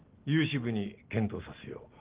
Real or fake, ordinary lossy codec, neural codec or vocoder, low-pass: real; Opus, 16 kbps; none; 3.6 kHz